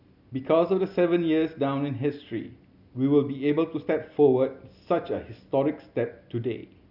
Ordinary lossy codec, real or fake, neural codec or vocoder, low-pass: none; real; none; 5.4 kHz